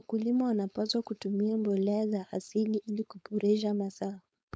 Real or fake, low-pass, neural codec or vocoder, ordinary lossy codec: fake; none; codec, 16 kHz, 4.8 kbps, FACodec; none